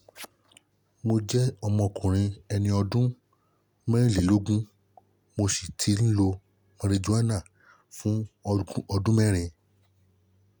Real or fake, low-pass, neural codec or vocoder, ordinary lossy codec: real; none; none; none